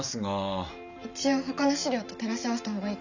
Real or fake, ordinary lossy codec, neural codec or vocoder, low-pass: real; none; none; 7.2 kHz